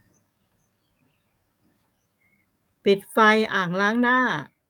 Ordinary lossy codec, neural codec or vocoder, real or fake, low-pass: none; codec, 44.1 kHz, 7.8 kbps, DAC; fake; 19.8 kHz